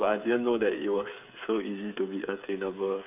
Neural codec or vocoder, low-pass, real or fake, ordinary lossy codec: codec, 16 kHz, 8 kbps, FreqCodec, smaller model; 3.6 kHz; fake; none